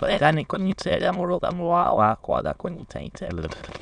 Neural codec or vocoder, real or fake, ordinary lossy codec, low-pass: autoencoder, 22.05 kHz, a latent of 192 numbers a frame, VITS, trained on many speakers; fake; none; 9.9 kHz